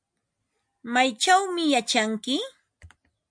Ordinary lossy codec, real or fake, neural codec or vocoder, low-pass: MP3, 64 kbps; real; none; 9.9 kHz